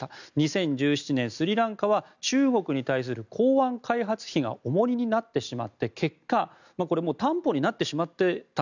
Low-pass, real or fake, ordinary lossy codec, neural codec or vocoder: 7.2 kHz; real; none; none